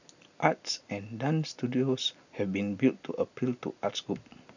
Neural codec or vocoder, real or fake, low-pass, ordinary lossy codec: none; real; 7.2 kHz; none